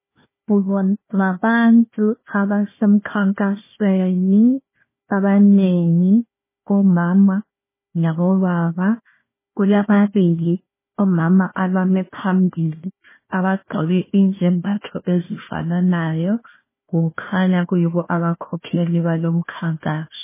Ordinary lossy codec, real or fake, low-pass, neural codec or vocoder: MP3, 16 kbps; fake; 3.6 kHz; codec, 16 kHz, 1 kbps, FunCodec, trained on Chinese and English, 50 frames a second